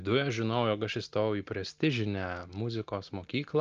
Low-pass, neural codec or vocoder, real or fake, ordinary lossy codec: 7.2 kHz; none; real; Opus, 24 kbps